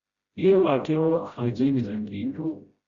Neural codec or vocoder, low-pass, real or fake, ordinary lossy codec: codec, 16 kHz, 0.5 kbps, FreqCodec, smaller model; 7.2 kHz; fake; Opus, 64 kbps